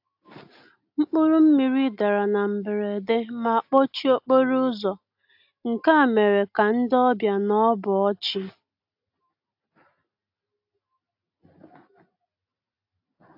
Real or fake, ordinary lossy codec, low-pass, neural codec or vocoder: real; AAC, 48 kbps; 5.4 kHz; none